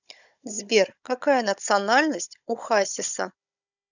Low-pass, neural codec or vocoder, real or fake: 7.2 kHz; codec, 16 kHz, 16 kbps, FunCodec, trained on Chinese and English, 50 frames a second; fake